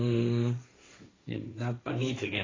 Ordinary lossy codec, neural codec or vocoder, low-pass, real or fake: AAC, 32 kbps; codec, 16 kHz, 1.1 kbps, Voila-Tokenizer; 7.2 kHz; fake